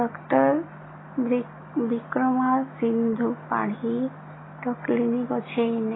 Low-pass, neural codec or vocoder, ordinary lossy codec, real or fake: 7.2 kHz; none; AAC, 16 kbps; real